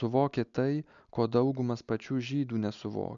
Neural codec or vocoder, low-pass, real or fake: none; 7.2 kHz; real